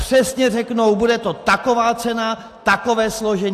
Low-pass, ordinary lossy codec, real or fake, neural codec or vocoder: 14.4 kHz; AAC, 64 kbps; real; none